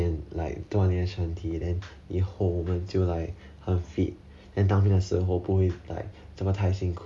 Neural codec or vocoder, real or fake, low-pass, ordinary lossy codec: none; real; none; none